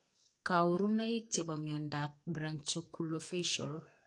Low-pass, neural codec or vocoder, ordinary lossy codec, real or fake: 10.8 kHz; codec, 44.1 kHz, 2.6 kbps, SNAC; AAC, 48 kbps; fake